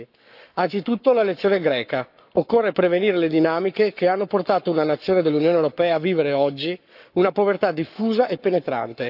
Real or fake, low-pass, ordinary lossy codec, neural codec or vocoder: fake; 5.4 kHz; none; codec, 44.1 kHz, 7.8 kbps, Pupu-Codec